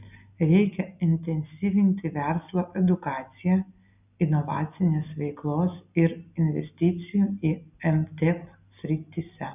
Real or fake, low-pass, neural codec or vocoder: real; 3.6 kHz; none